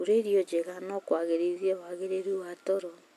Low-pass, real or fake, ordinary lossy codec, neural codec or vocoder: 14.4 kHz; real; none; none